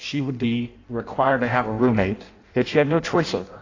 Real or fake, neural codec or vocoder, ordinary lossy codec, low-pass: fake; codec, 16 kHz in and 24 kHz out, 0.6 kbps, FireRedTTS-2 codec; AAC, 32 kbps; 7.2 kHz